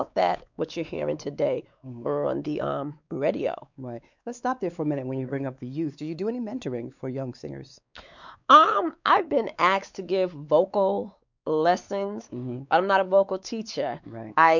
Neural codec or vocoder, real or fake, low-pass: codec, 16 kHz, 4 kbps, X-Codec, WavLM features, trained on Multilingual LibriSpeech; fake; 7.2 kHz